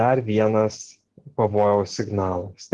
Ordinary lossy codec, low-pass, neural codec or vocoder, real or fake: Opus, 16 kbps; 10.8 kHz; none; real